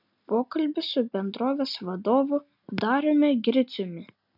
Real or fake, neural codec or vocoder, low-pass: real; none; 5.4 kHz